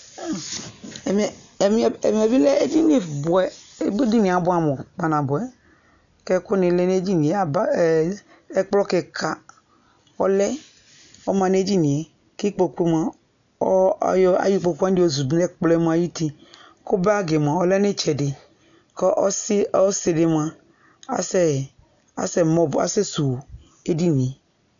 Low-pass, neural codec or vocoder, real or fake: 7.2 kHz; none; real